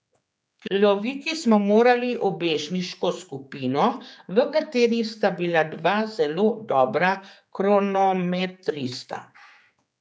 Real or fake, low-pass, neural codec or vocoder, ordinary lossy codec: fake; none; codec, 16 kHz, 4 kbps, X-Codec, HuBERT features, trained on general audio; none